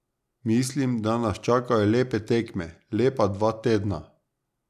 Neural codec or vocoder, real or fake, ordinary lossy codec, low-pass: none; real; none; 14.4 kHz